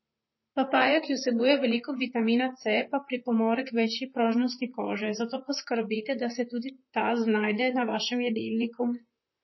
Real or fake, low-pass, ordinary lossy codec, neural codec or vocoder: fake; 7.2 kHz; MP3, 24 kbps; vocoder, 44.1 kHz, 128 mel bands, Pupu-Vocoder